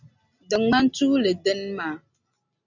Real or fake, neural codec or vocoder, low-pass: real; none; 7.2 kHz